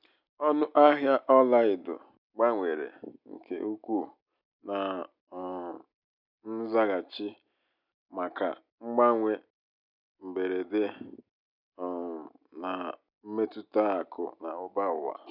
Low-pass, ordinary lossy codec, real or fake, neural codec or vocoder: 5.4 kHz; none; real; none